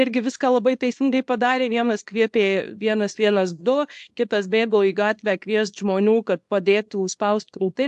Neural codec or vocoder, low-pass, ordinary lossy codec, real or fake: codec, 24 kHz, 0.9 kbps, WavTokenizer, small release; 10.8 kHz; AAC, 64 kbps; fake